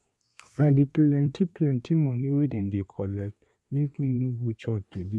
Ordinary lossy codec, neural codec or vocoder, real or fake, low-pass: none; codec, 24 kHz, 1 kbps, SNAC; fake; none